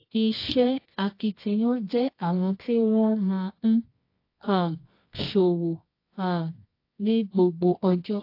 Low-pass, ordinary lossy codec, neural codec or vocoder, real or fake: 5.4 kHz; AAC, 32 kbps; codec, 24 kHz, 0.9 kbps, WavTokenizer, medium music audio release; fake